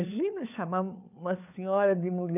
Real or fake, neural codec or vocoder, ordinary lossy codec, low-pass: fake; codec, 16 kHz, 4 kbps, FunCodec, trained on LibriTTS, 50 frames a second; none; 3.6 kHz